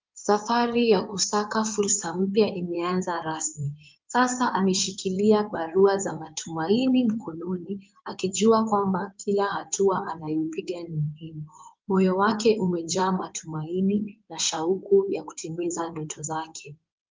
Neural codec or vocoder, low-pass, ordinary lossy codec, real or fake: codec, 16 kHz in and 24 kHz out, 2.2 kbps, FireRedTTS-2 codec; 7.2 kHz; Opus, 32 kbps; fake